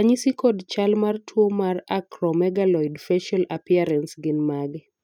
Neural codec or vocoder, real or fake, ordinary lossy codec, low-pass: none; real; none; 19.8 kHz